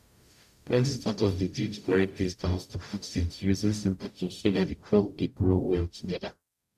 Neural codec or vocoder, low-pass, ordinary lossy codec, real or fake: codec, 44.1 kHz, 0.9 kbps, DAC; 14.4 kHz; none; fake